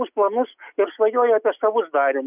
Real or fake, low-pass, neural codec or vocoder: real; 3.6 kHz; none